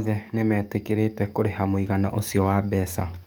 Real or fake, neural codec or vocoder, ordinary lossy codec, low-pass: fake; codec, 44.1 kHz, 7.8 kbps, DAC; none; none